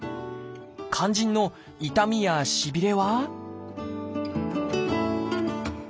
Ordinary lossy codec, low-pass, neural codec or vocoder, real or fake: none; none; none; real